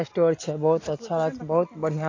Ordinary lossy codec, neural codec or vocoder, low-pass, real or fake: AAC, 32 kbps; none; 7.2 kHz; real